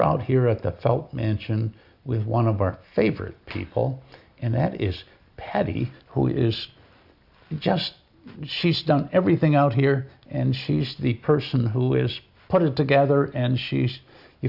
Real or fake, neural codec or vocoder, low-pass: real; none; 5.4 kHz